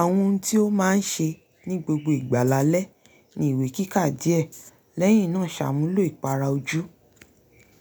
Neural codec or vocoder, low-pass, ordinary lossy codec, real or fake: none; none; none; real